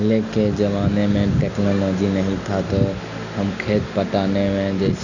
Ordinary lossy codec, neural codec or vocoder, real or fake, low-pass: none; none; real; 7.2 kHz